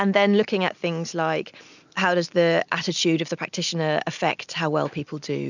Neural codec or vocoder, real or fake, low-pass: none; real; 7.2 kHz